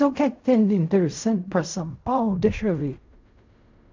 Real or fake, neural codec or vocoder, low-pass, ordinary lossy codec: fake; codec, 16 kHz in and 24 kHz out, 0.4 kbps, LongCat-Audio-Codec, fine tuned four codebook decoder; 7.2 kHz; MP3, 64 kbps